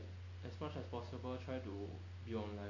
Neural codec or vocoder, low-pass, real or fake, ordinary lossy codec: none; 7.2 kHz; real; none